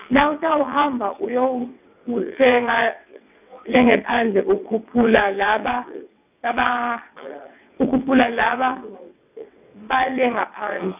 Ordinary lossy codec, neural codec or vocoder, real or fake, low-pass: none; vocoder, 22.05 kHz, 80 mel bands, WaveNeXt; fake; 3.6 kHz